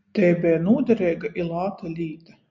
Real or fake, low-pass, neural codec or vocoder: real; 7.2 kHz; none